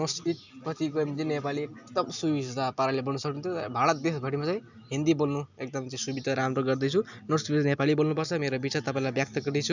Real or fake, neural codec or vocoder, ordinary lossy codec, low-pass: real; none; none; 7.2 kHz